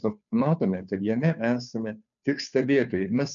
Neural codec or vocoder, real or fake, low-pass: codec, 16 kHz, 2 kbps, FunCodec, trained on Chinese and English, 25 frames a second; fake; 7.2 kHz